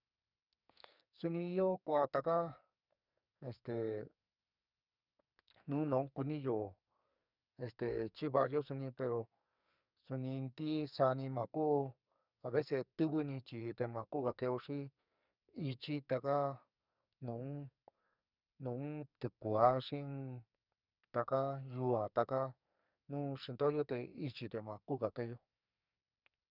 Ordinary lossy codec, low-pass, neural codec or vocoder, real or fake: none; 5.4 kHz; codec, 44.1 kHz, 2.6 kbps, SNAC; fake